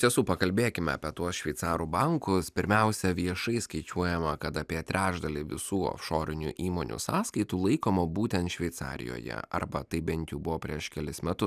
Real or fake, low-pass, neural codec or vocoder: real; 14.4 kHz; none